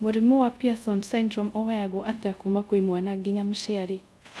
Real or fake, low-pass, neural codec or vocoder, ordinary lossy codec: fake; none; codec, 24 kHz, 0.5 kbps, DualCodec; none